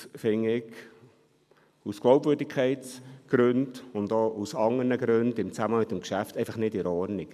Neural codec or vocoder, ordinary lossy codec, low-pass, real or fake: none; MP3, 96 kbps; 14.4 kHz; real